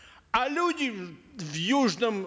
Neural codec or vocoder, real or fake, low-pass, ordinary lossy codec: none; real; none; none